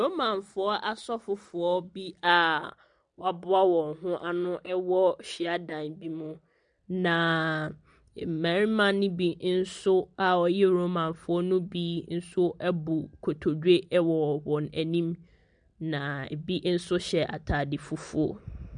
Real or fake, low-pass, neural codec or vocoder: real; 9.9 kHz; none